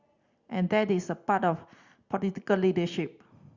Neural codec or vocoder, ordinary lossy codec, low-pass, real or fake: none; Opus, 64 kbps; 7.2 kHz; real